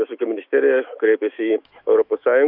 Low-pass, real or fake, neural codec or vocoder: 5.4 kHz; fake; autoencoder, 48 kHz, 128 numbers a frame, DAC-VAE, trained on Japanese speech